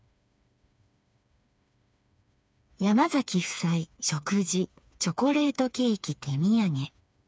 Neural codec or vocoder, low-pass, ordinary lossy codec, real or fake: codec, 16 kHz, 4 kbps, FreqCodec, smaller model; none; none; fake